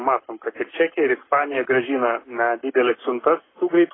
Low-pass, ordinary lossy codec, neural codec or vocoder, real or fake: 7.2 kHz; AAC, 16 kbps; codec, 44.1 kHz, 7.8 kbps, Pupu-Codec; fake